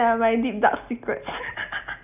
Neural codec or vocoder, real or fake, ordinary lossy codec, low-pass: none; real; none; 3.6 kHz